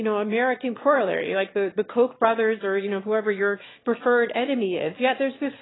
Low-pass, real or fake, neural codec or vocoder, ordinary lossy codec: 7.2 kHz; fake; autoencoder, 22.05 kHz, a latent of 192 numbers a frame, VITS, trained on one speaker; AAC, 16 kbps